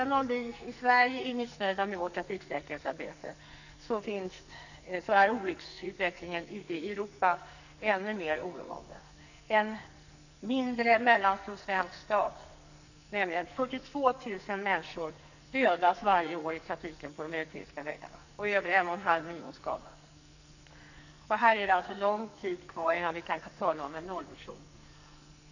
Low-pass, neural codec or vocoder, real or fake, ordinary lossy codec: 7.2 kHz; codec, 44.1 kHz, 2.6 kbps, SNAC; fake; none